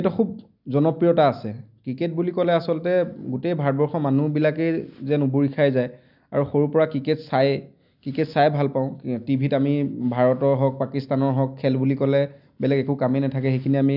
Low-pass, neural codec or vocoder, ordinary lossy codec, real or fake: 5.4 kHz; none; none; real